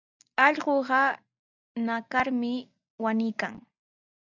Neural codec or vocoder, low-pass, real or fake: none; 7.2 kHz; real